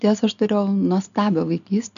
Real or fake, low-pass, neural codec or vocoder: real; 7.2 kHz; none